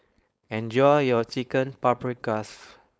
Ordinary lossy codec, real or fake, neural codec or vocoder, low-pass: none; fake; codec, 16 kHz, 4.8 kbps, FACodec; none